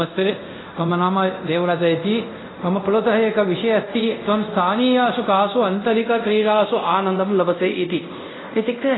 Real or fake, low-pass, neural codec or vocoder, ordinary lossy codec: fake; 7.2 kHz; codec, 24 kHz, 0.5 kbps, DualCodec; AAC, 16 kbps